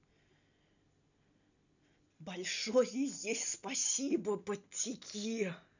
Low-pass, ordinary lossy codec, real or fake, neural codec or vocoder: 7.2 kHz; none; real; none